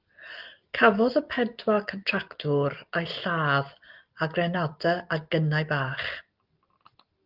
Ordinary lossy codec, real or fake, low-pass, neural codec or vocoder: Opus, 32 kbps; real; 5.4 kHz; none